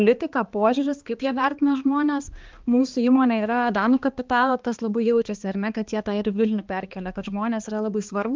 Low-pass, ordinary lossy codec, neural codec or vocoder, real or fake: 7.2 kHz; Opus, 32 kbps; codec, 16 kHz, 2 kbps, X-Codec, HuBERT features, trained on balanced general audio; fake